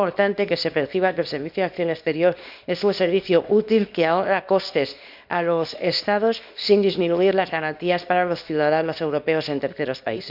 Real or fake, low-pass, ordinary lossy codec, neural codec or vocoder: fake; 5.4 kHz; none; codec, 24 kHz, 0.9 kbps, WavTokenizer, small release